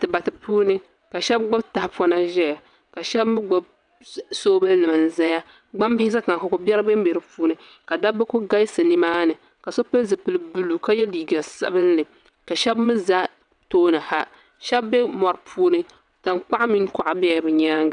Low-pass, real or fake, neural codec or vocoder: 9.9 kHz; fake; vocoder, 22.05 kHz, 80 mel bands, WaveNeXt